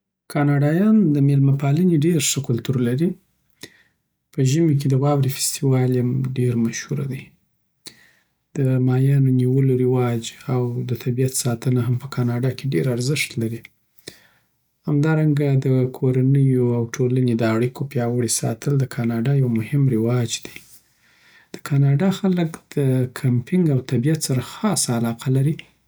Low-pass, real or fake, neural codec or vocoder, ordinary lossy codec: none; real; none; none